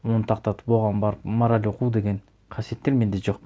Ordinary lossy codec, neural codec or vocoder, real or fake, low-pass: none; none; real; none